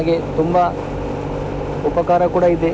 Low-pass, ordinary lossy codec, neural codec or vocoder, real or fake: none; none; none; real